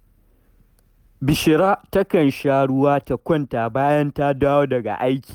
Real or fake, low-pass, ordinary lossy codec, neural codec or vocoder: real; 19.8 kHz; Opus, 32 kbps; none